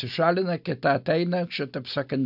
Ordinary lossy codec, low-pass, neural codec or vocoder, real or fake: AAC, 48 kbps; 5.4 kHz; none; real